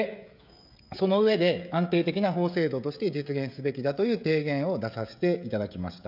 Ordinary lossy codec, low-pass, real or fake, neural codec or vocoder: none; 5.4 kHz; fake; codec, 16 kHz, 16 kbps, FreqCodec, smaller model